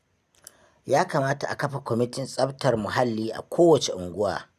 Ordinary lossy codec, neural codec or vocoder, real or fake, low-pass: none; none; real; 14.4 kHz